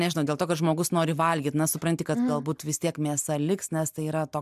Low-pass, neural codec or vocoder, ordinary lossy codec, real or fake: 14.4 kHz; none; MP3, 96 kbps; real